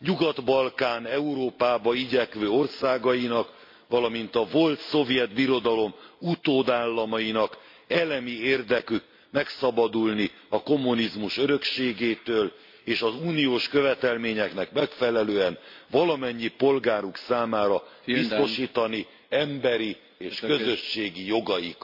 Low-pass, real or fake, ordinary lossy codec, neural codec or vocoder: 5.4 kHz; real; MP3, 32 kbps; none